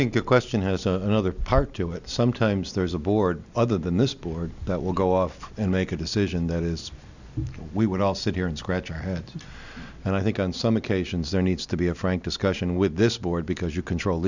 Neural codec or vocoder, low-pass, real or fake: none; 7.2 kHz; real